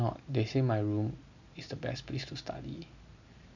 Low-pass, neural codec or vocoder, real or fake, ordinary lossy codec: 7.2 kHz; none; real; none